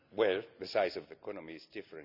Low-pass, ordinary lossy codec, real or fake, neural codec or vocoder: 5.4 kHz; Opus, 64 kbps; real; none